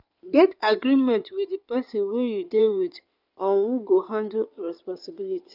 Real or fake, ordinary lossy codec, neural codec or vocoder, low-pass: fake; none; codec, 16 kHz in and 24 kHz out, 2.2 kbps, FireRedTTS-2 codec; 5.4 kHz